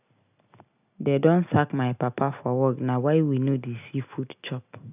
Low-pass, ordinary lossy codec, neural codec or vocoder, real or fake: 3.6 kHz; none; none; real